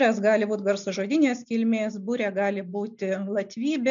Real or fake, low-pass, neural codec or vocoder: real; 7.2 kHz; none